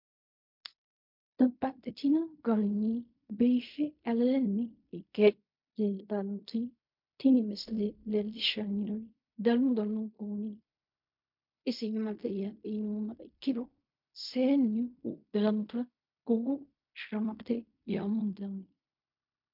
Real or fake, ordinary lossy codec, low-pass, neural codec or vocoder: fake; MP3, 48 kbps; 5.4 kHz; codec, 16 kHz in and 24 kHz out, 0.4 kbps, LongCat-Audio-Codec, fine tuned four codebook decoder